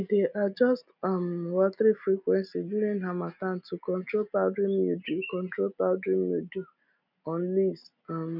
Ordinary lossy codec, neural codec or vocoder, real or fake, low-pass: none; none; real; 5.4 kHz